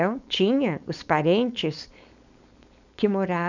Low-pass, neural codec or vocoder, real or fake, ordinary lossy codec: 7.2 kHz; codec, 16 kHz, 4.8 kbps, FACodec; fake; none